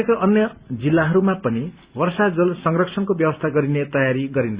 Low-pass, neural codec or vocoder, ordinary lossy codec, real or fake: 3.6 kHz; none; Opus, 64 kbps; real